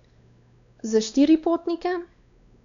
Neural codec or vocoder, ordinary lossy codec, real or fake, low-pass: codec, 16 kHz, 2 kbps, X-Codec, WavLM features, trained on Multilingual LibriSpeech; none; fake; 7.2 kHz